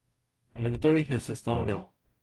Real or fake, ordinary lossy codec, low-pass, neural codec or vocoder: fake; Opus, 32 kbps; 19.8 kHz; codec, 44.1 kHz, 0.9 kbps, DAC